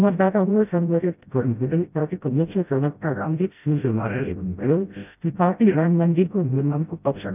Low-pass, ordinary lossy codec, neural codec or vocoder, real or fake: 3.6 kHz; none; codec, 16 kHz, 0.5 kbps, FreqCodec, smaller model; fake